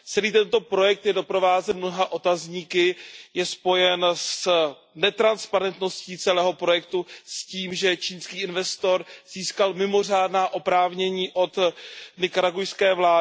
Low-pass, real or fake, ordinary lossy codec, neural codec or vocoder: none; real; none; none